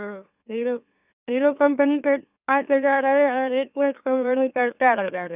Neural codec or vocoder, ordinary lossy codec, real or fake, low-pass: autoencoder, 44.1 kHz, a latent of 192 numbers a frame, MeloTTS; none; fake; 3.6 kHz